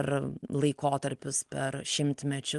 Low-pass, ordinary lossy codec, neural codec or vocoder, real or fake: 10.8 kHz; Opus, 24 kbps; none; real